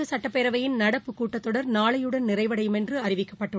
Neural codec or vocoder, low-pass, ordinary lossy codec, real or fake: none; none; none; real